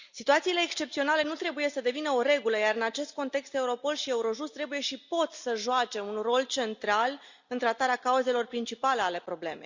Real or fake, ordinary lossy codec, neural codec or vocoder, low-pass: real; Opus, 64 kbps; none; 7.2 kHz